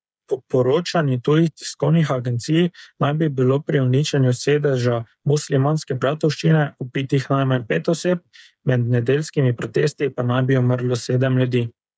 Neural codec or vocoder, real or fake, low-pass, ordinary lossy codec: codec, 16 kHz, 8 kbps, FreqCodec, smaller model; fake; none; none